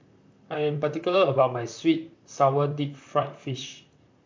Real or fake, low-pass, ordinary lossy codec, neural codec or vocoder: fake; 7.2 kHz; MP3, 64 kbps; vocoder, 44.1 kHz, 128 mel bands, Pupu-Vocoder